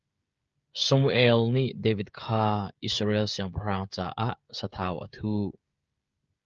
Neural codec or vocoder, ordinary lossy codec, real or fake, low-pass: codec, 16 kHz, 16 kbps, FreqCodec, smaller model; Opus, 24 kbps; fake; 7.2 kHz